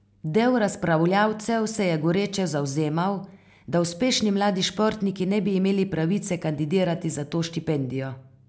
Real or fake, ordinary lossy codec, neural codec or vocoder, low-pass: real; none; none; none